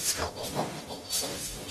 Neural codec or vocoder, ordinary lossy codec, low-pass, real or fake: codec, 44.1 kHz, 0.9 kbps, DAC; AAC, 32 kbps; 19.8 kHz; fake